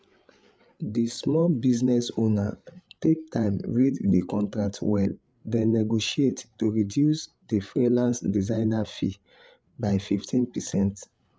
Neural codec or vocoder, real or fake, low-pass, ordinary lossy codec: codec, 16 kHz, 8 kbps, FreqCodec, larger model; fake; none; none